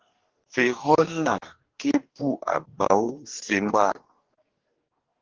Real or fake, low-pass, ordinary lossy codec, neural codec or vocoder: fake; 7.2 kHz; Opus, 16 kbps; codec, 44.1 kHz, 2.6 kbps, DAC